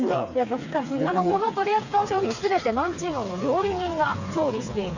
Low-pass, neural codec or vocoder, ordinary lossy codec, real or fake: 7.2 kHz; codec, 16 kHz, 4 kbps, FreqCodec, smaller model; none; fake